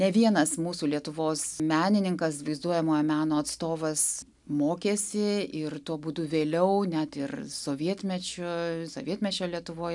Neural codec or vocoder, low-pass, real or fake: none; 10.8 kHz; real